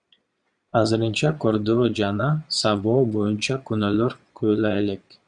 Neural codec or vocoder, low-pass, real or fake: vocoder, 22.05 kHz, 80 mel bands, Vocos; 9.9 kHz; fake